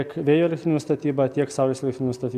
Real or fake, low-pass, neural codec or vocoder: real; 14.4 kHz; none